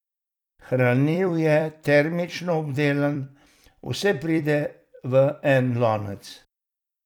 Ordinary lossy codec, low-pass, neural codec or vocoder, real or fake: none; 19.8 kHz; vocoder, 44.1 kHz, 128 mel bands every 256 samples, BigVGAN v2; fake